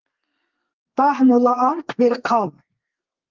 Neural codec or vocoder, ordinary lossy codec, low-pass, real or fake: codec, 32 kHz, 1.9 kbps, SNAC; Opus, 24 kbps; 7.2 kHz; fake